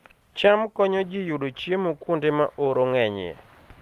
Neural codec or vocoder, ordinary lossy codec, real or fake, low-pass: none; Opus, 32 kbps; real; 14.4 kHz